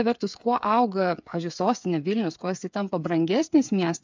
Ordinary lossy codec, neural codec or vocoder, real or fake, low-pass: MP3, 64 kbps; codec, 16 kHz, 8 kbps, FreqCodec, smaller model; fake; 7.2 kHz